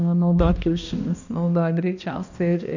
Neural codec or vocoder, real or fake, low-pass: codec, 16 kHz, 1 kbps, X-Codec, HuBERT features, trained on balanced general audio; fake; 7.2 kHz